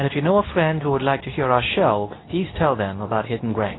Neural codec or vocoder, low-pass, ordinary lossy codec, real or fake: codec, 24 kHz, 0.9 kbps, WavTokenizer, medium speech release version 2; 7.2 kHz; AAC, 16 kbps; fake